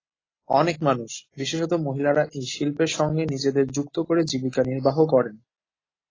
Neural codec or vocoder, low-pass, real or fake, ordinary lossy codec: none; 7.2 kHz; real; AAC, 32 kbps